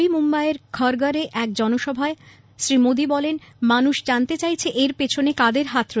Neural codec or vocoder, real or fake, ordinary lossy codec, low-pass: none; real; none; none